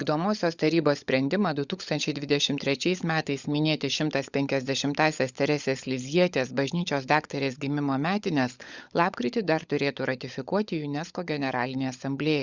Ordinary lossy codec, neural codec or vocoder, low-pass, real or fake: Opus, 64 kbps; codec, 16 kHz, 16 kbps, FunCodec, trained on LibriTTS, 50 frames a second; 7.2 kHz; fake